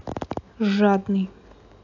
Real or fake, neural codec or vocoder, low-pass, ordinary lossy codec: real; none; 7.2 kHz; AAC, 48 kbps